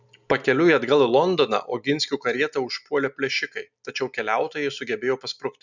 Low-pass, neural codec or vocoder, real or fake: 7.2 kHz; none; real